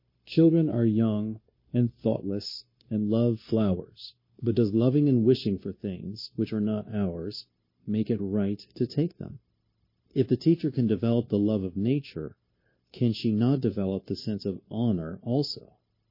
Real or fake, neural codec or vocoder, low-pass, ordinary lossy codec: fake; codec, 16 kHz, 0.9 kbps, LongCat-Audio-Codec; 5.4 kHz; MP3, 24 kbps